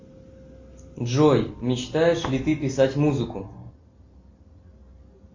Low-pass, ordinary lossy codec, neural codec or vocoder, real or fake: 7.2 kHz; MP3, 48 kbps; none; real